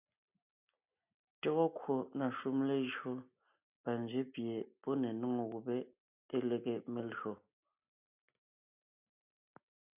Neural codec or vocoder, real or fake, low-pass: none; real; 3.6 kHz